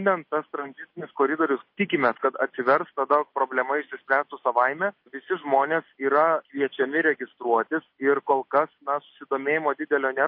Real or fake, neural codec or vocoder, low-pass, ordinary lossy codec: real; none; 5.4 kHz; MP3, 32 kbps